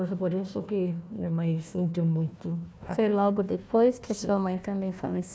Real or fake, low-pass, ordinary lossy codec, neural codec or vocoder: fake; none; none; codec, 16 kHz, 1 kbps, FunCodec, trained on Chinese and English, 50 frames a second